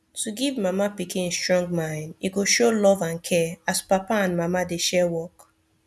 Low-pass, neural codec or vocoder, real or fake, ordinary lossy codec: none; none; real; none